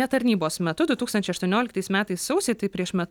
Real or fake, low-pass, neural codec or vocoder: real; 19.8 kHz; none